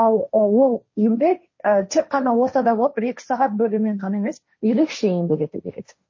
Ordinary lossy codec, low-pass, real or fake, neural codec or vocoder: MP3, 32 kbps; 7.2 kHz; fake; codec, 16 kHz, 1.1 kbps, Voila-Tokenizer